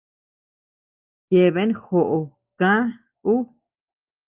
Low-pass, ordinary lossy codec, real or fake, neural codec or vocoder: 3.6 kHz; Opus, 24 kbps; real; none